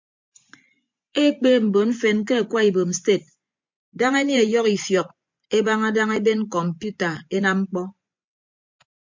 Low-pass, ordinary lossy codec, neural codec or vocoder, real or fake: 7.2 kHz; MP3, 64 kbps; vocoder, 24 kHz, 100 mel bands, Vocos; fake